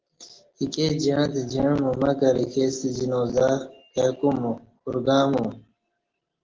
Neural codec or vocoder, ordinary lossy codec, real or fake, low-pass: none; Opus, 16 kbps; real; 7.2 kHz